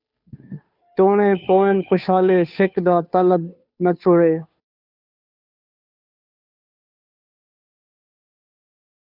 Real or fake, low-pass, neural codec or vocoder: fake; 5.4 kHz; codec, 16 kHz, 2 kbps, FunCodec, trained on Chinese and English, 25 frames a second